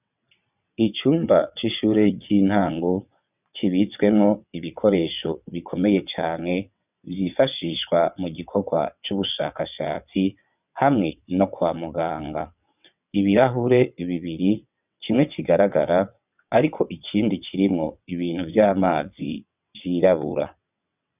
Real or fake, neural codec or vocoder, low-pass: fake; vocoder, 22.05 kHz, 80 mel bands, WaveNeXt; 3.6 kHz